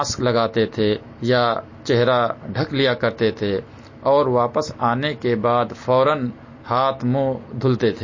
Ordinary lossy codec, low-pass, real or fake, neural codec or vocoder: MP3, 32 kbps; 7.2 kHz; real; none